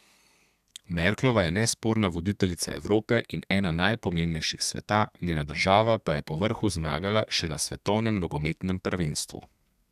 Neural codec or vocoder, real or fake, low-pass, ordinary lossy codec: codec, 32 kHz, 1.9 kbps, SNAC; fake; 14.4 kHz; none